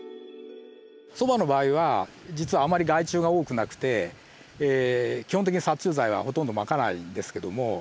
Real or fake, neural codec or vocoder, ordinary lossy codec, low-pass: real; none; none; none